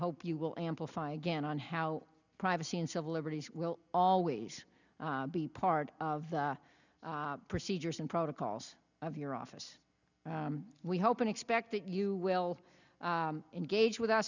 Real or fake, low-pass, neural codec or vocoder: real; 7.2 kHz; none